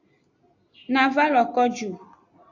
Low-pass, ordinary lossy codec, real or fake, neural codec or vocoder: 7.2 kHz; AAC, 48 kbps; real; none